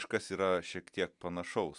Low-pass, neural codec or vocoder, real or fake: 10.8 kHz; none; real